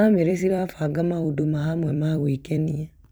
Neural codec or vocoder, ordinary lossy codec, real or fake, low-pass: vocoder, 44.1 kHz, 128 mel bands every 256 samples, BigVGAN v2; none; fake; none